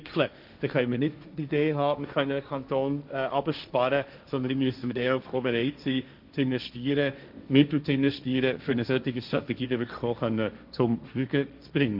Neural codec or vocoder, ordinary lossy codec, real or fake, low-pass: codec, 16 kHz, 1.1 kbps, Voila-Tokenizer; none; fake; 5.4 kHz